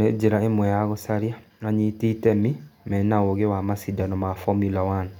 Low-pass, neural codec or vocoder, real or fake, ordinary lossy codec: 19.8 kHz; none; real; none